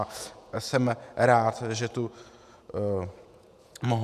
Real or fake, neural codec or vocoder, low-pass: fake; vocoder, 48 kHz, 128 mel bands, Vocos; 14.4 kHz